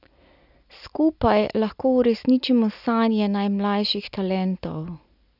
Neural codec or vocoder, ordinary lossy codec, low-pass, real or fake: none; none; 5.4 kHz; real